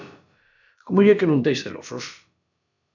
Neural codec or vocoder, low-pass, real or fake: codec, 16 kHz, about 1 kbps, DyCAST, with the encoder's durations; 7.2 kHz; fake